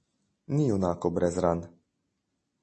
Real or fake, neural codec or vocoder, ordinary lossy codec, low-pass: real; none; MP3, 32 kbps; 10.8 kHz